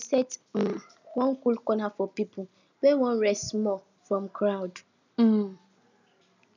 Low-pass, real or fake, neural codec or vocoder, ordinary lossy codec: 7.2 kHz; real; none; none